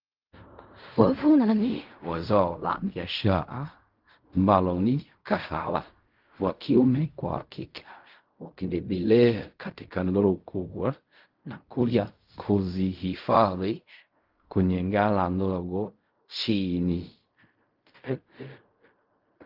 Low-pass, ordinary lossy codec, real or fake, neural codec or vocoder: 5.4 kHz; Opus, 24 kbps; fake; codec, 16 kHz in and 24 kHz out, 0.4 kbps, LongCat-Audio-Codec, fine tuned four codebook decoder